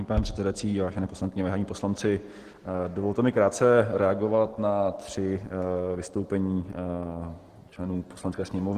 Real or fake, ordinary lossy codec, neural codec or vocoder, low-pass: real; Opus, 16 kbps; none; 14.4 kHz